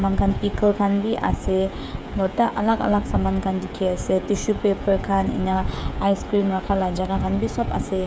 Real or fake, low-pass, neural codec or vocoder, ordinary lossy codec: fake; none; codec, 16 kHz, 16 kbps, FreqCodec, smaller model; none